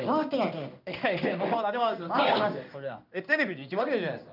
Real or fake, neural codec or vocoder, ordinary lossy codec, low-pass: fake; codec, 16 kHz in and 24 kHz out, 1 kbps, XY-Tokenizer; none; 5.4 kHz